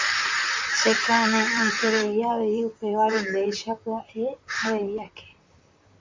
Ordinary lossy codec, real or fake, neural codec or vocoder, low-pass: MP3, 64 kbps; fake; vocoder, 44.1 kHz, 128 mel bands, Pupu-Vocoder; 7.2 kHz